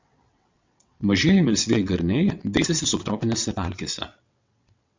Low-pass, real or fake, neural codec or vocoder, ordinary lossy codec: 7.2 kHz; fake; vocoder, 22.05 kHz, 80 mel bands, WaveNeXt; MP3, 64 kbps